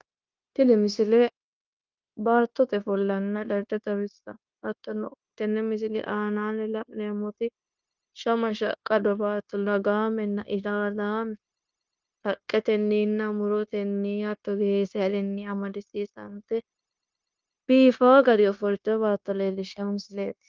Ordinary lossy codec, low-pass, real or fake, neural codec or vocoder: Opus, 24 kbps; 7.2 kHz; fake; codec, 16 kHz, 0.9 kbps, LongCat-Audio-Codec